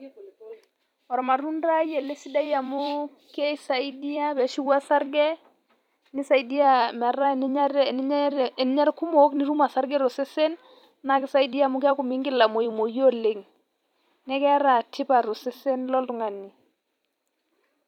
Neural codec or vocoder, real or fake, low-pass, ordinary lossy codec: vocoder, 44.1 kHz, 128 mel bands every 256 samples, BigVGAN v2; fake; 19.8 kHz; none